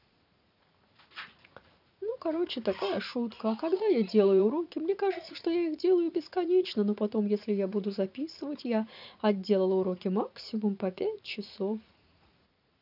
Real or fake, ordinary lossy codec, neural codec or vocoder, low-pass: fake; none; vocoder, 44.1 kHz, 80 mel bands, Vocos; 5.4 kHz